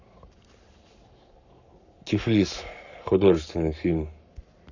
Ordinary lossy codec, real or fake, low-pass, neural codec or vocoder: none; fake; 7.2 kHz; codec, 44.1 kHz, 7.8 kbps, Pupu-Codec